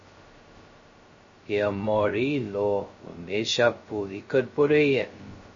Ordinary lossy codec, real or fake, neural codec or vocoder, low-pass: MP3, 32 kbps; fake; codec, 16 kHz, 0.2 kbps, FocalCodec; 7.2 kHz